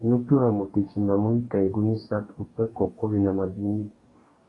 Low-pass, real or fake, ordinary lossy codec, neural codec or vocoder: 10.8 kHz; fake; AAC, 32 kbps; codec, 44.1 kHz, 2.6 kbps, DAC